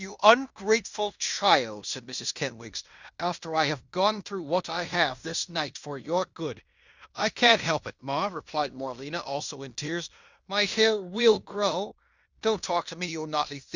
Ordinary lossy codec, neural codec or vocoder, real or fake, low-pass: Opus, 64 kbps; codec, 16 kHz in and 24 kHz out, 0.9 kbps, LongCat-Audio-Codec, fine tuned four codebook decoder; fake; 7.2 kHz